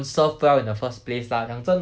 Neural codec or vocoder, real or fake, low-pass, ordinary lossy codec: none; real; none; none